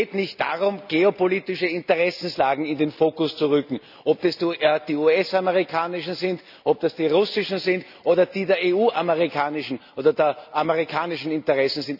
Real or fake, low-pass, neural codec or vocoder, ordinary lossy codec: real; 5.4 kHz; none; none